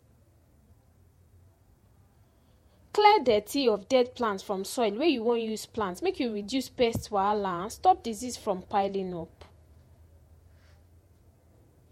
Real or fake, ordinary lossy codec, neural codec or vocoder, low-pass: fake; MP3, 64 kbps; vocoder, 48 kHz, 128 mel bands, Vocos; 19.8 kHz